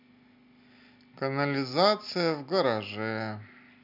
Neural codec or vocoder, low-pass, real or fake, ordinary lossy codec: none; 5.4 kHz; real; MP3, 48 kbps